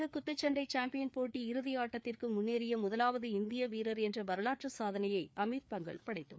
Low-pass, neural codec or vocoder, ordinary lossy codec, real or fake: none; codec, 16 kHz, 4 kbps, FreqCodec, larger model; none; fake